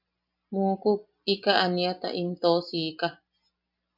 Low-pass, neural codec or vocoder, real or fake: 5.4 kHz; none; real